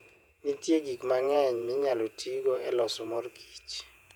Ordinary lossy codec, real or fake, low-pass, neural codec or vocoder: none; fake; 19.8 kHz; vocoder, 48 kHz, 128 mel bands, Vocos